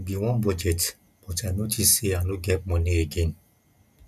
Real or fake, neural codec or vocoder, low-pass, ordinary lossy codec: real; none; 14.4 kHz; none